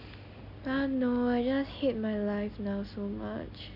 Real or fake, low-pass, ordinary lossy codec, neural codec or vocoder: real; 5.4 kHz; none; none